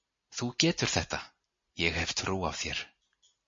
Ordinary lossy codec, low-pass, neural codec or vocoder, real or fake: MP3, 32 kbps; 7.2 kHz; none; real